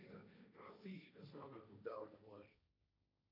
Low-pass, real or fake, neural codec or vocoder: 5.4 kHz; fake; codec, 16 kHz, 1.1 kbps, Voila-Tokenizer